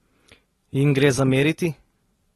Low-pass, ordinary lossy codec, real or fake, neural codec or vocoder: 19.8 kHz; AAC, 32 kbps; real; none